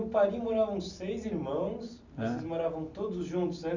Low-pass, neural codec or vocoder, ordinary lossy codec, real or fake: 7.2 kHz; none; none; real